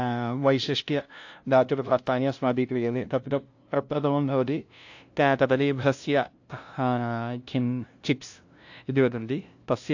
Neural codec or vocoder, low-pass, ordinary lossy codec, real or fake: codec, 16 kHz, 0.5 kbps, FunCodec, trained on LibriTTS, 25 frames a second; 7.2 kHz; AAC, 48 kbps; fake